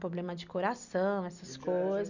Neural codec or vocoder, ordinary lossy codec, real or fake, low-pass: none; none; real; 7.2 kHz